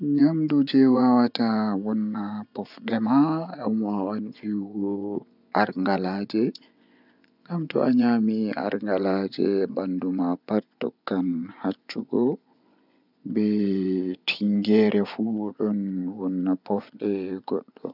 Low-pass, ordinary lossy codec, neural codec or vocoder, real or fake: 5.4 kHz; none; vocoder, 24 kHz, 100 mel bands, Vocos; fake